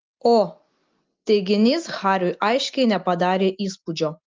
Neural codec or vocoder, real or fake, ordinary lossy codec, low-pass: none; real; Opus, 32 kbps; 7.2 kHz